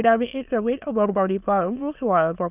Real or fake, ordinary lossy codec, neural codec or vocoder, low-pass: fake; none; autoencoder, 22.05 kHz, a latent of 192 numbers a frame, VITS, trained on many speakers; 3.6 kHz